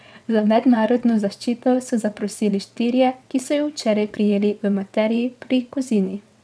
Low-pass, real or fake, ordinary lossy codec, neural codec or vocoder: none; fake; none; vocoder, 22.05 kHz, 80 mel bands, WaveNeXt